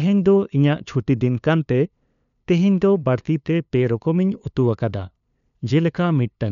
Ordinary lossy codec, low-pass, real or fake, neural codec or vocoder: none; 7.2 kHz; fake; codec, 16 kHz, 2 kbps, FunCodec, trained on LibriTTS, 25 frames a second